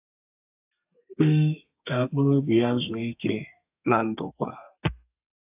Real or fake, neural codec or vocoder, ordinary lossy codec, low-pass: fake; codec, 32 kHz, 1.9 kbps, SNAC; AAC, 32 kbps; 3.6 kHz